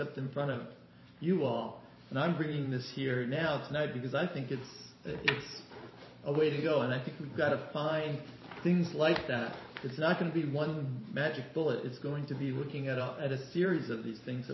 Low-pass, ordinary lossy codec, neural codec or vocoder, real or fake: 7.2 kHz; MP3, 24 kbps; vocoder, 44.1 kHz, 128 mel bands every 512 samples, BigVGAN v2; fake